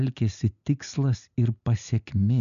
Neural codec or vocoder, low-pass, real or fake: none; 7.2 kHz; real